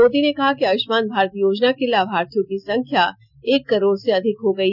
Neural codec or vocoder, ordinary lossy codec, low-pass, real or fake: none; none; 5.4 kHz; real